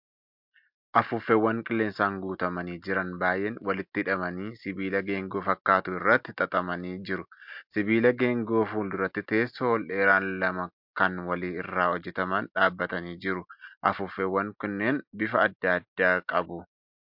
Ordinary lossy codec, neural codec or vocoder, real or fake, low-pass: MP3, 48 kbps; none; real; 5.4 kHz